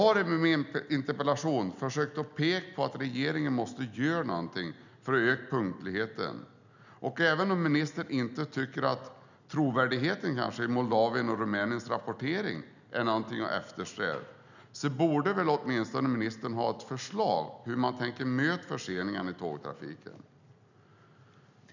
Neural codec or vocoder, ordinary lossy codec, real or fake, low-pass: none; none; real; 7.2 kHz